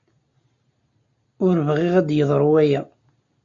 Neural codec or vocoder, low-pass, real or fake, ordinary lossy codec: none; 7.2 kHz; real; AAC, 64 kbps